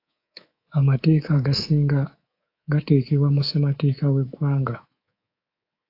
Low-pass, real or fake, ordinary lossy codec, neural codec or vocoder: 5.4 kHz; fake; AAC, 32 kbps; codec, 24 kHz, 3.1 kbps, DualCodec